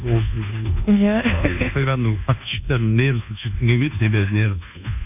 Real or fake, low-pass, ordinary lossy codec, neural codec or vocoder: fake; 3.6 kHz; none; codec, 24 kHz, 1.2 kbps, DualCodec